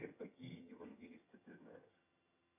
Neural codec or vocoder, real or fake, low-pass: vocoder, 22.05 kHz, 80 mel bands, HiFi-GAN; fake; 3.6 kHz